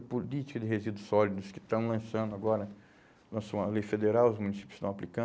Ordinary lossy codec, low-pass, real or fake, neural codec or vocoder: none; none; real; none